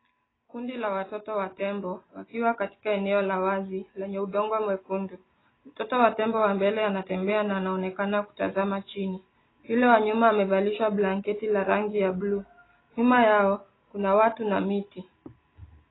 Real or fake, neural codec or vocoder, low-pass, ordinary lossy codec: real; none; 7.2 kHz; AAC, 16 kbps